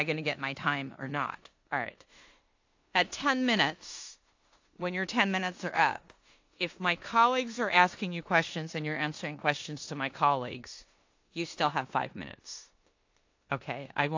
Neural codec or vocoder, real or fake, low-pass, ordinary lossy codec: codec, 16 kHz in and 24 kHz out, 0.9 kbps, LongCat-Audio-Codec, four codebook decoder; fake; 7.2 kHz; AAC, 48 kbps